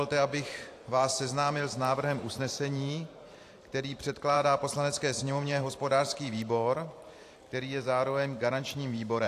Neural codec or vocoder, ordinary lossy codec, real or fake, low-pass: vocoder, 44.1 kHz, 128 mel bands every 512 samples, BigVGAN v2; AAC, 64 kbps; fake; 14.4 kHz